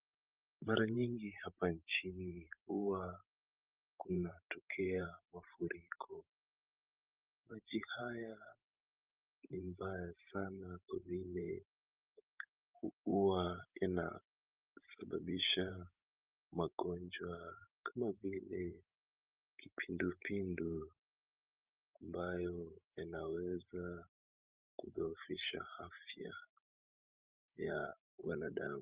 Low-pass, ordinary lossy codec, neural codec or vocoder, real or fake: 3.6 kHz; Opus, 24 kbps; vocoder, 44.1 kHz, 128 mel bands every 512 samples, BigVGAN v2; fake